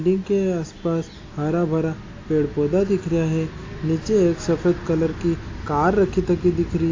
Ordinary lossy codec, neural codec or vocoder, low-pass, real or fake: AAC, 32 kbps; none; 7.2 kHz; real